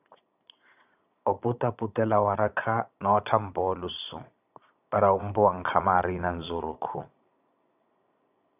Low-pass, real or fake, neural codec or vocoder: 3.6 kHz; fake; vocoder, 22.05 kHz, 80 mel bands, Vocos